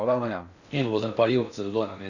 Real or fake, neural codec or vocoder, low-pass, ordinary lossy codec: fake; codec, 16 kHz in and 24 kHz out, 0.6 kbps, FocalCodec, streaming, 2048 codes; 7.2 kHz; none